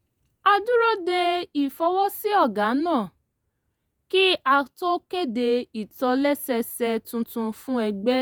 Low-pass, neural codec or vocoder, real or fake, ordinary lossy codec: none; vocoder, 48 kHz, 128 mel bands, Vocos; fake; none